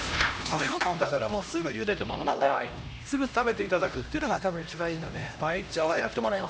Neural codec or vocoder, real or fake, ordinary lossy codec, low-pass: codec, 16 kHz, 1 kbps, X-Codec, HuBERT features, trained on LibriSpeech; fake; none; none